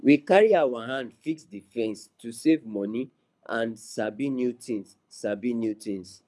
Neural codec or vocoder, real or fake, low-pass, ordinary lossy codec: codec, 24 kHz, 6 kbps, HILCodec; fake; none; none